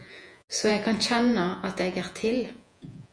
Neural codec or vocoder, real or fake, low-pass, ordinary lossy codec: vocoder, 48 kHz, 128 mel bands, Vocos; fake; 9.9 kHz; MP3, 64 kbps